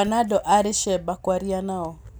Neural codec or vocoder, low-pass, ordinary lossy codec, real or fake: none; none; none; real